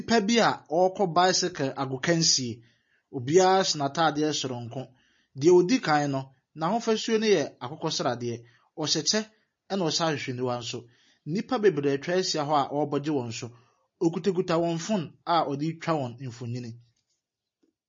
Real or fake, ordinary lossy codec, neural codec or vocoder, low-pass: real; MP3, 32 kbps; none; 7.2 kHz